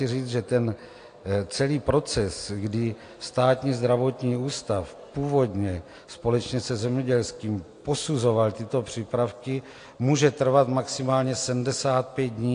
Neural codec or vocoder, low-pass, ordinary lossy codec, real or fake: none; 9.9 kHz; AAC, 48 kbps; real